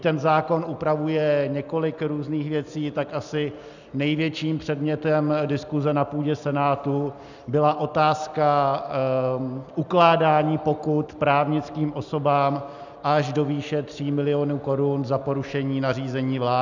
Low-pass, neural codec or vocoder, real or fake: 7.2 kHz; none; real